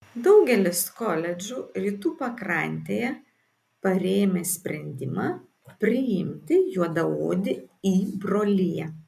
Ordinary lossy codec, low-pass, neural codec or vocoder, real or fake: MP3, 96 kbps; 14.4 kHz; none; real